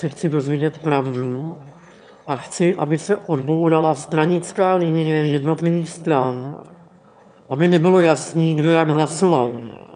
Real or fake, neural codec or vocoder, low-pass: fake; autoencoder, 22.05 kHz, a latent of 192 numbers a frame, VITS, trained on one speaker; 9.9 kHz